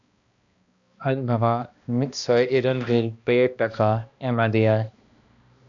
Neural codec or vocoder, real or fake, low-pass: codec, 16 kHz, 1 kbps, X-Codec, HuBERT features, trained on balanced general audio; fake; 7.2 kHz